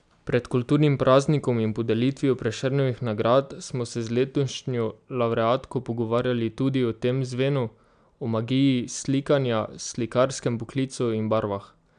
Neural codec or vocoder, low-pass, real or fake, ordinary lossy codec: none; 9.9 kHz; real; none